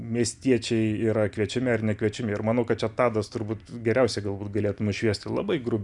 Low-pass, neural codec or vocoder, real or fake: 10.8 kHz; none; real